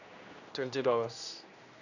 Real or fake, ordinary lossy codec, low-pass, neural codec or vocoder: fake; none; 7.2 kHz; codec, 16 kHz, 1 kbps, X-Codec, HuBERT features, trained on general audio